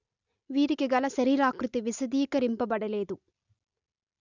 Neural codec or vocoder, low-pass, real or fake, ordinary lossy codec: none; 7.2 kHz; real; none